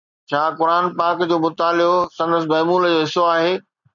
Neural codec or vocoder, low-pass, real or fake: none; 7.2 kHz; real